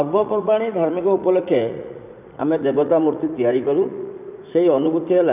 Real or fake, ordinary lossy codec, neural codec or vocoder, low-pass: fake; none; vocoder, 44.1 kHz, 80 mel bands, Vocos; 3.6 kHz